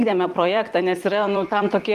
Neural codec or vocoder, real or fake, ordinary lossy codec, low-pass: vocoder, 44.1 kHz, 128 mel bands every 256 samples, BigVGAN v2; fake; Opus, 24 kbps; 14.4 kHz